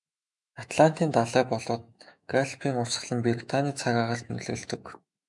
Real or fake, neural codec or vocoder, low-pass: fake; autoencoder, 48 kHz, 128 numbers a frame, DAC-VAE, trained on Japanese speech; 10.8 kHz